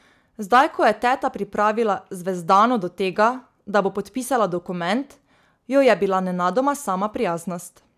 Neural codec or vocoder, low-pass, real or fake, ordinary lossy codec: none; 14.4 kHz; real; none